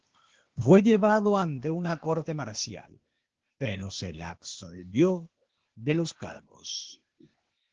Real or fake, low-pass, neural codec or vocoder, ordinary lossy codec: fake; 7.2 kHz; codec, 16 kHz, 0.8 kbps, ZipCodec; Opus, 16 kbps